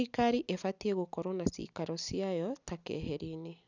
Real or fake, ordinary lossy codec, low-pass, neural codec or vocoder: real; none; 7.2 kHz; none